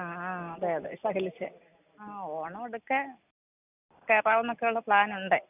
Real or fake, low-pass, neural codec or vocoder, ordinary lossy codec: real; 3.6 kHz; none; none